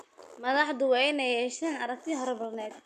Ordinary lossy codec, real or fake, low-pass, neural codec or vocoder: none; real; 14.4 kHz; none